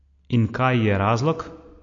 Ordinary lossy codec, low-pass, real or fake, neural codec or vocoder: MP3, 48 kbps; 7.2 kHz; real; none